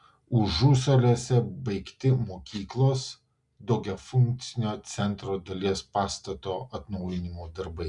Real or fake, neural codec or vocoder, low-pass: real; none; 10.8 kHz